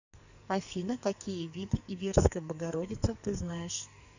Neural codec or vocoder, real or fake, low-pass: codec, 44.1 kHz, 2.6 kbps, SNAC; fake; 7.2 kHz